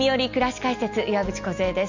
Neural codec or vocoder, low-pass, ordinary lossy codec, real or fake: none; 7.2 kHz; none; real